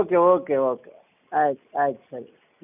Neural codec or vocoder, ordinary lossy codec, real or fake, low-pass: none; none; real; 3.6 kHz